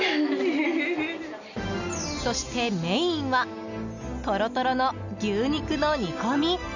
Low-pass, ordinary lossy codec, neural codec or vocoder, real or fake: 7.2 kHz; none; none; real